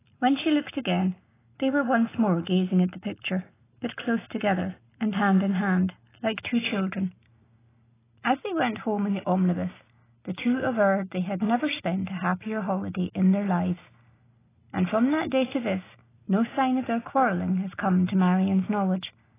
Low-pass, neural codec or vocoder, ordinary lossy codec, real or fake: 3.6 kHz; none; AAC, 16 kbps; real